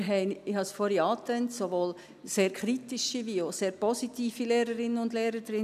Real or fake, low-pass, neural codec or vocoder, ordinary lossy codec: real; 14.4 kHz; none; none